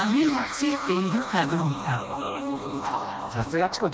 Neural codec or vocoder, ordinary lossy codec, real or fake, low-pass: codec, 16 kHz, 1 kbps, FreqCodec, smaller model; none; fake; none